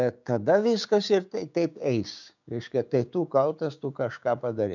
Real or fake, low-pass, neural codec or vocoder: fake; 7.2 kHz; codec, 16 kHz, 6 kbps, DAC